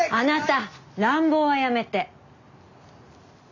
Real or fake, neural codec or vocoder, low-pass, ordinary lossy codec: real; none; 7.2 kHz; none